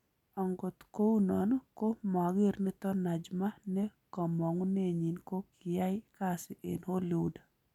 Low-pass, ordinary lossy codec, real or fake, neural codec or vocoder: 19.8 kHz; none; real; none